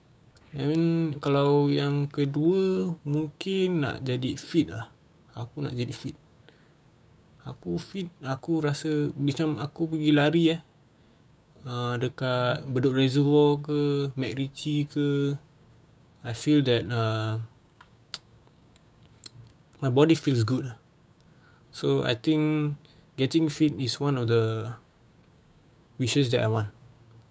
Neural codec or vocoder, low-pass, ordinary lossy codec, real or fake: codec, 16 kHz, 6 kbps, DAC; none; none; fake